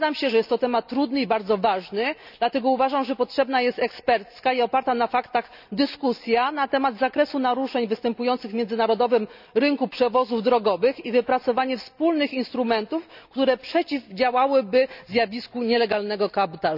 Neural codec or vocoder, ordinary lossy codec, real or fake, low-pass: none; none; real; 5.4 kHz